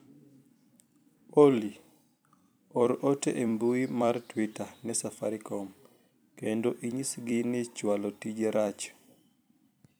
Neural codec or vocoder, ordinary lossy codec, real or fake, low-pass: vocoder, 44.1 kHz, 128 mel bands every 256 samples, BigVGAN v2; none; fake; none